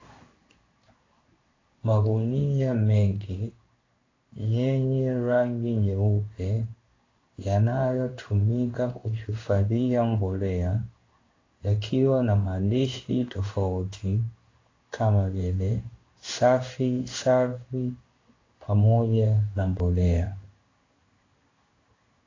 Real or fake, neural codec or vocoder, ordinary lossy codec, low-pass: fake; codec, 16 kHz in and 24 kHz out, 1 kbps, XY-Tokenizer; AAC, 32 kbps; 7.2 kHz